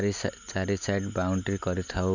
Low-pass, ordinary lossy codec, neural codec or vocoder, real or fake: 7.2 kHz; none; none; real